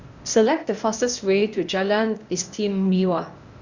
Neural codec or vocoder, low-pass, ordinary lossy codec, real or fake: codec, 16 kHz, 0.8 kbps, ZipCodec; 7.2 kHz; Opus, 64 kbps; fake